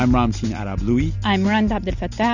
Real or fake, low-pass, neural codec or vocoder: real; 7.2 kHz; none